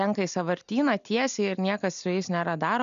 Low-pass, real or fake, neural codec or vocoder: 7.2 kHz; real; none